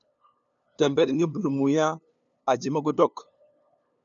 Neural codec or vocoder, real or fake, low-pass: codec, 16 kHz, 2 kbps, FunCodec, trained on LibriTTS, 25 frames a second; fake; 7.2 kHz